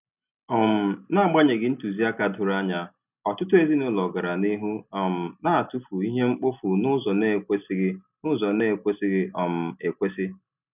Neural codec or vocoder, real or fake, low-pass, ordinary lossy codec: none; real; 3.6 kHz; none